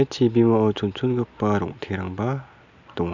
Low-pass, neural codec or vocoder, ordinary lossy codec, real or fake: 7.2 kHz; none; none; real